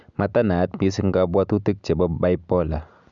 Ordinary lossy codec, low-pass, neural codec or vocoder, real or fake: MP3, 96 kbps; 7.2 kHz; none; real